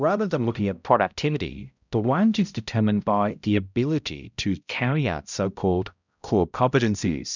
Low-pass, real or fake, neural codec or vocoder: 7.2 kHz; fake; codec, 16 kHz, 0.5 kbps, X-Codec, HuBERT features, trained on balanced general audio